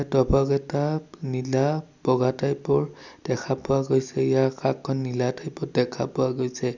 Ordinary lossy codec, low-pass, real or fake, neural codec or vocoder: none; 7.2 kHz; real; none